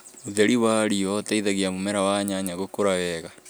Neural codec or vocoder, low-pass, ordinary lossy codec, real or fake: none; none; none; real